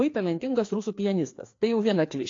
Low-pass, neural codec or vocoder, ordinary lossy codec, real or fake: 7.2 kHz; codec, 16 kHz, 2 kbps, FreqCodec, larger model; AAC, 48 kbps; fake